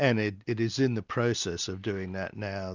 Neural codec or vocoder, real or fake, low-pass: none; real; 7.2 kHz